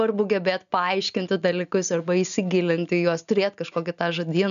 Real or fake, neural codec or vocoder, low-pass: real; none; 7.2 kHz